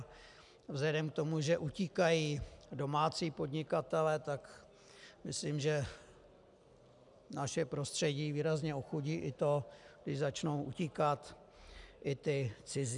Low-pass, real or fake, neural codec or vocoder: 10.8 kHz; real; none